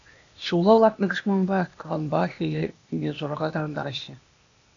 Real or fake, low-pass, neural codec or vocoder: fake; 7.2 kHz; codec, 16 kHz, 0.8 kbps, ZipCodec